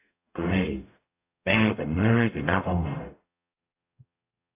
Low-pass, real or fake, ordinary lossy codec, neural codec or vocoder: 3.6 kHz; fake; AAC, 24 kbps; codec, 44.1 kHz, 0.9 kbps, DAC